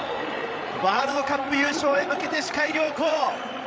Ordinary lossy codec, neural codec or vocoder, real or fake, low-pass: none; codec, 16 kHz, 16 kbps, FreqCodec, larger model; fake; none